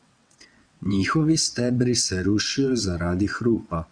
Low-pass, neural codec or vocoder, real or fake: 9.9 kHz; vocoder, 22.05 kHz, 80 mel bands, WaveNeXt; fake